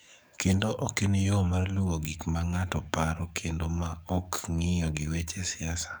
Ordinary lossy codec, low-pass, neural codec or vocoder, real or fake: none; none; codec, 44.1 kHz, 7.8 kbps, DAC; fake